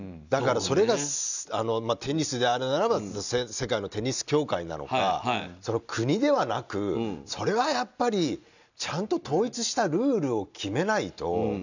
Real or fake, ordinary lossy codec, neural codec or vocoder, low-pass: real; none; none; 7.2 kHz